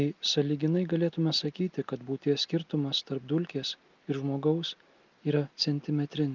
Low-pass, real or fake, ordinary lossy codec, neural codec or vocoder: 7.2 kHz; real; Opus, 32 kbps; none